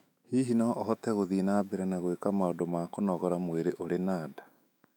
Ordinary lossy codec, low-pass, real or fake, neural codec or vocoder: none; 19.8 kHz; fake; autoencoder, 48 kHz, 128 numbers a frame, DAC-VAE, trained on Japanese speech